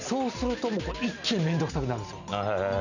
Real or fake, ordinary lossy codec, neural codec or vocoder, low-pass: real; none; none; 7.2 kHz